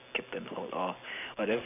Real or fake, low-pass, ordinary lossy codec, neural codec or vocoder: real; 3.6 kHz; none; none